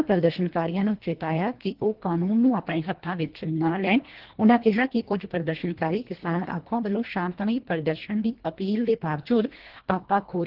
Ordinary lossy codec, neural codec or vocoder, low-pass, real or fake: Opus, 16 kbps; codec, 24 kHz, 1.5 kbps, HILCodec; 5.4 kHz; fake